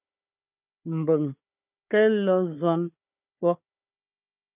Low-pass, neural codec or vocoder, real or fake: 3.6 kHz; codec, 16 kHz, 4 kbps, FunCodec, trained on Chinese and English, 50 frames a second; fake